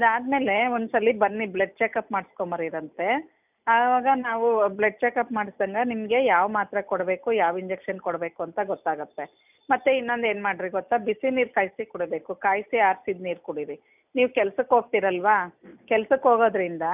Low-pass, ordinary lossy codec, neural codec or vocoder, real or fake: 3.6 kHz; none; none; real